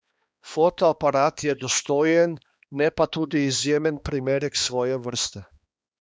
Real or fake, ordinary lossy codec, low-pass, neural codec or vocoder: fake; none; none; codec, 16 kHz, 2 kbps, X-Codec, HuBERT features, trained on balanced general audio